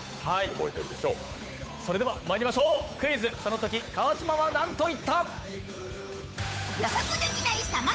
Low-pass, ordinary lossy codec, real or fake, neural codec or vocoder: none; none; fake; codec, 16 kHz, 8 kbps, FunCodec, trained on Chinese and English, 25 frames a second